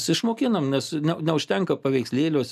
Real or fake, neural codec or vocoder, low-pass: real; none; 14.4 kHz